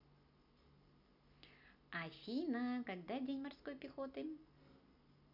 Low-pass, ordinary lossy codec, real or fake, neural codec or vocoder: 5.4 kHz; none; real; none